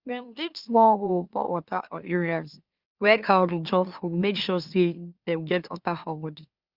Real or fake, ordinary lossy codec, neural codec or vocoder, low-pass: fake; Opus, 64 kbps; autoencoder, 44.1 kHz, a latent of 192 numbers a frame, MeloTTS; 5.4 kHz